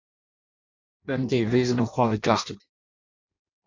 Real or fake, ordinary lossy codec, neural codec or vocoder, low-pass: fake; AAC, 48 kbps; codec, 16 kHz in and 24 kHz out, 0.6 kbps, FireRedTTS-2 codec; 7.2 kHz